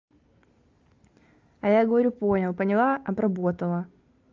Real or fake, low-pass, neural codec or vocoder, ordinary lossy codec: real; 7.2 kHz; none; Opus, 32 kbps